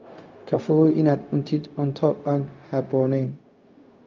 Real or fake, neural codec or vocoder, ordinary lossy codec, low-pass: fake; codec, 16 kHz, 0.4 kbps, LongCat-Audio-Codec; Opus, 24 kbps; 7.2 kHz